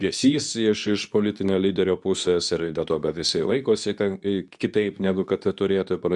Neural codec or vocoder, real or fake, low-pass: codec, 24 kHz, 0.9 kbps, WavTokenizer, medium speech release version 2; fake; 10.8 kHz